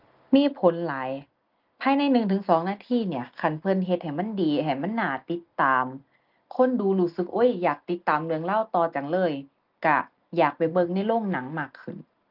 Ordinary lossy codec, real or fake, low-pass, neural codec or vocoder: Opus, 24 kbps; real; 5.4 kHz; none